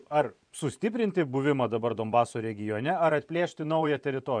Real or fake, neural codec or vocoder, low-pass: fake; vocoder, 24 kHz, 100 mel bands, Vocos; 9.9 kHz